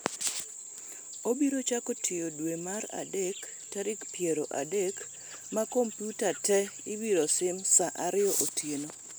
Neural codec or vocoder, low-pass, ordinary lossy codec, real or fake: none; none; none; real